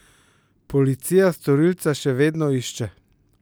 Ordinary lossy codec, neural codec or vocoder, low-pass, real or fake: none; none; none; real